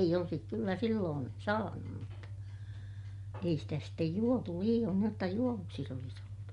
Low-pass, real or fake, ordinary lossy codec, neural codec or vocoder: 10.8 kHz; real; MP3, 64 kbps; none